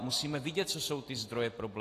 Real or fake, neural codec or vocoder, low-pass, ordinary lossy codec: real; none; 14.4 kHz; AAC, 48 kbps